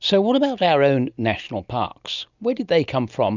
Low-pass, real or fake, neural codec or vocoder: 7.2 kHz; real; none